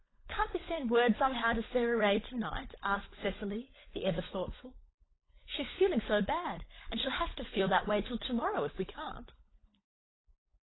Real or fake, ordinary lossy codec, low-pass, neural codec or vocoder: fake; AAC, 16 kbps; 7.2 kHz; codec, 16 kHz, 16 kbps, FunCodec, trained on LibriTTS, 50 frames a second